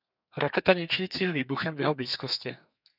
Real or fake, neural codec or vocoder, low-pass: fake; codec, 32 kHz, 1.9 kbps, SNAC; 5.4 kHz